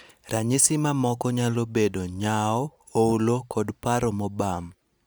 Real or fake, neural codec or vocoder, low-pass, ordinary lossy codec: real; none; none; none